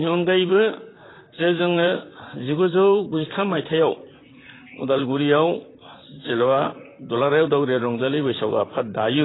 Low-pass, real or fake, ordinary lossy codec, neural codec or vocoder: 7.2 kHz; fake; AAC, 16 kbps; vocoder, 44.1 kHz, 128 mel bands, Pupu-Vocoder